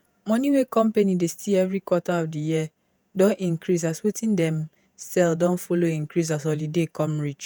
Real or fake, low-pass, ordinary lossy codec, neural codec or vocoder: fake; none; none; vocoder, 48 kHz, 128 mel bands, Vocos